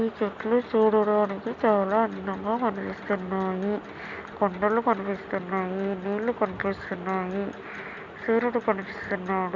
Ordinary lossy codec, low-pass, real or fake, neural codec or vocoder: Opus, 64 kbps; 7.2 kHz; real; none